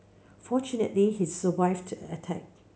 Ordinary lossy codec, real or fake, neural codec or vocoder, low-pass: none; real; none; none